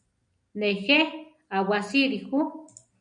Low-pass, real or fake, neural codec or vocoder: 9.9 kHz; real; none